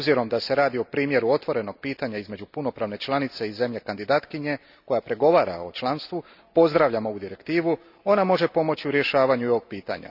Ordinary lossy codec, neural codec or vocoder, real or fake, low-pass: none; none; real; 5.4 kHz